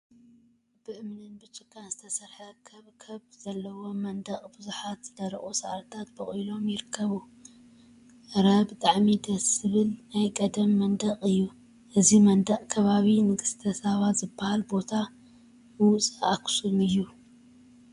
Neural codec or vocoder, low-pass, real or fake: vocoder, 24 kHz, 100 mel bands, Vocos; 10.8 kHz; fake